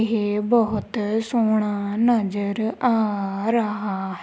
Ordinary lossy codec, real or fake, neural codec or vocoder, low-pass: none; real; none; none